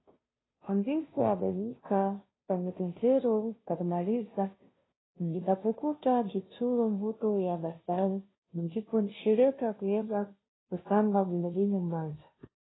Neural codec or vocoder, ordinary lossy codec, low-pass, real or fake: codec, 16 kHz, 0.5 kbps, FunCodec, trained on Chinese and English, 25 frames a second; AAC, 16 kbps; 7.2 kHz; fake